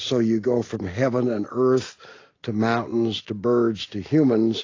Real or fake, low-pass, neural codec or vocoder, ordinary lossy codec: real; 7.2 kHz; none; AAC, 32 kbps